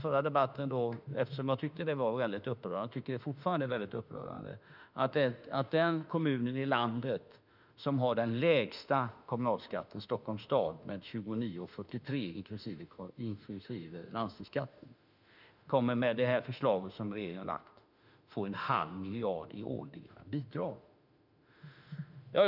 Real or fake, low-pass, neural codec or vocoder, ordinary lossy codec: fake; 5.4 kHz; autoencoder, 48 kHz, 32 numbers a frame, DAC-VAE, trained on Japanese speech; none